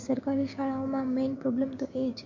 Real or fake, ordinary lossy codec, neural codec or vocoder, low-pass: fake; MP3, 48 kbps; codec, 16 kHz, 6 kbps, DAC; 7.2 kHz